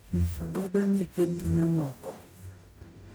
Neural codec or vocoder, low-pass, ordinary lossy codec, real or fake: codec, 44.1 kHz, 0.9 kbps, DAC; none; none; fake